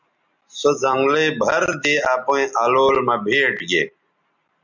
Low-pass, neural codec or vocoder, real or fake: 7.2 kHz; none; real